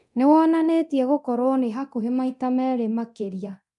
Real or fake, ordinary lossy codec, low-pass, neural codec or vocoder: fake; none; 10.8 kHz; codec, 24 kHz, 0.9 kbps, DualCodec